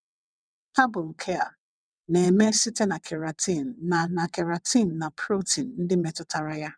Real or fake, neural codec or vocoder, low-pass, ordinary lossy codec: fake; vocoder, 22.05 kHz, 80 mel bands, WaveNeXt; 9.9 kHz; none